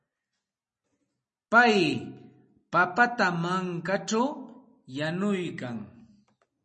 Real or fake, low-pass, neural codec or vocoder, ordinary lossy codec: real; 9.9 kHz; none; MP3, 32 kbps